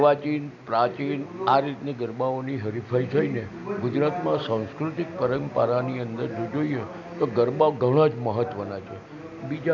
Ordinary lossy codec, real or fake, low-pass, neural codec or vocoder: none; real; 7.2 kHz; none